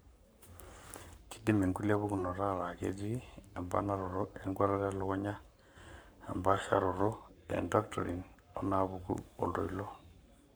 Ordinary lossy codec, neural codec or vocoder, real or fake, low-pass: none; codec, 44.1 kHz, 7.8 kbps, Pupu-Codec; fake; none